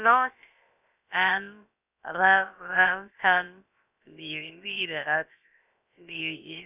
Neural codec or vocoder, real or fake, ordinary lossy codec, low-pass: codec, 16 kHz, about 1 kbps, DyCAST, with the encoder's durations; fake; none; 3.6 kHz